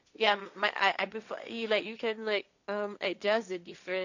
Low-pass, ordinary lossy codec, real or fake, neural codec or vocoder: 7.2 kHz; none; fake; codec, 16 kHz, 1.1 kbps, Voila-Tokenizer